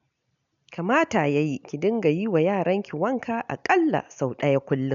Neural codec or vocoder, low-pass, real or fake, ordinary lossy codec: none; 7.2 kHz; real; none